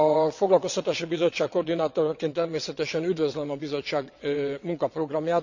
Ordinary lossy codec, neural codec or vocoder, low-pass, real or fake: none; vocoder, 22.05 kHz, 80 mel bands, WaveNeXt; 7.2 kHz; fake